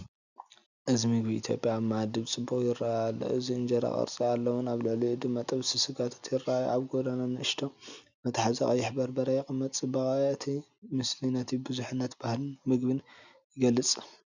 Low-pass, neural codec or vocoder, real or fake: 7.2 kHz; none; real